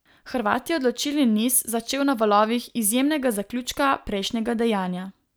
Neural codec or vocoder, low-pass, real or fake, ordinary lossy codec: none; none; real; none